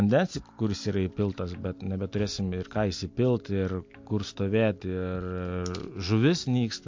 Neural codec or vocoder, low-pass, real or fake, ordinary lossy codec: none; 7.2 kHz; real; MP3, 48 kbps